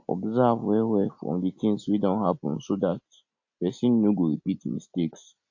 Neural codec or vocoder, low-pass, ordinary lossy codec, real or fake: none; 7.2 kHz; AAC, 48 kbps; real